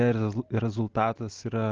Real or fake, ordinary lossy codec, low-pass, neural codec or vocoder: real; Opus, 16 kbps; 7.2 kHz; none